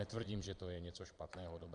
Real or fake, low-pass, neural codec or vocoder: fake; 9.9 kHz; vocoder, 22.05 kHz, 80 mel bands, Vocos